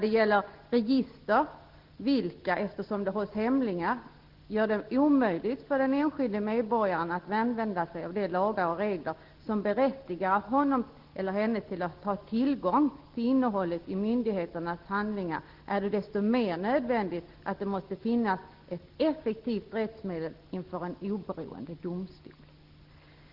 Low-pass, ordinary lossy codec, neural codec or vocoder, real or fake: 5.4 kHz; Opus, 16 kbps; none; real